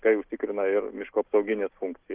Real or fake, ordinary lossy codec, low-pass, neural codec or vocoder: real; Opus, 24 kbps; 3.6 kHz; none